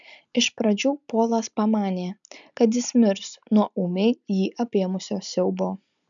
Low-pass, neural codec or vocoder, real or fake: 7.2 kHz; none; real